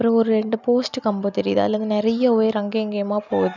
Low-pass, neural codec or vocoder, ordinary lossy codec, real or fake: 7.2 kHz; none; none; real